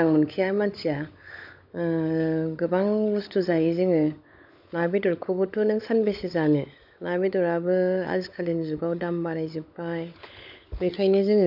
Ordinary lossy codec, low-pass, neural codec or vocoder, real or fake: AAC, 48 kbps; 5.4 kHz; codec, 16 kHz, 16 kbps, FunCodec, trained on LibriTTS, 50 frames a second; fake